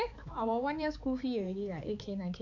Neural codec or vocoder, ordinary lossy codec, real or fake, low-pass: codec, 16 kHz, 4 kbps, X-Codec, HuBERT features, trained on balanced general audio; none; fake; 7.2 kHz